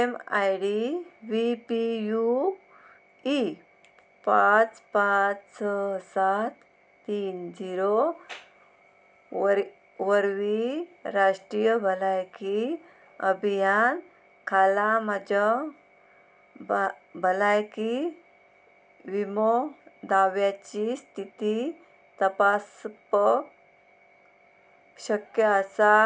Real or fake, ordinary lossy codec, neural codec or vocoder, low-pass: real; none; none; none